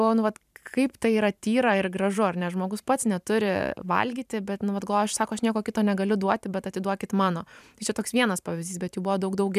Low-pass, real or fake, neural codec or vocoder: 14.4 kHz; fake; vocoder, 44.1 kHz, 128 mel bands every 512 samples, BigVGAN v2